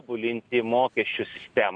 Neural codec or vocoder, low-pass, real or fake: none; 9.9 kHz; real